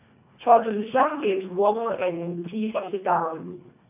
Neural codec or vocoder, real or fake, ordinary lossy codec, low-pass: codec, 24 kHz, 1.5 kbps, HILCodec; fake; none; 3.6 kHz